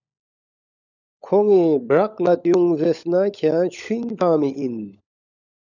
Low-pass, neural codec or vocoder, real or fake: 7.2 kHz; codec, 16 kHz, 16 kbps, FunCodec, trained on LibriTTS, 50 frames a second; fake